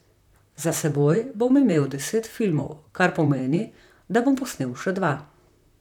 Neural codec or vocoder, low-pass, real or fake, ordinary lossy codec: vocoder, 44.1 kHz, 128 mel bands, Pupu-Vocoder; 19.8 kHz; fake; none